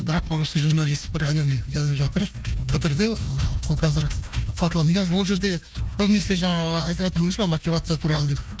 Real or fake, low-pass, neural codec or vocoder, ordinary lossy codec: fake; none; codec, 16 kHz, 1 kbps, FunCodec, trained on Chinese and English, 50 frames a second; none